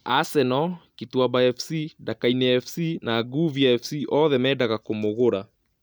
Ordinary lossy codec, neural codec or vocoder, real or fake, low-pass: none; none; real; none